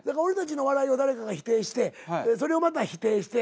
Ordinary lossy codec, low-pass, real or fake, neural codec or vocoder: none; none; real; none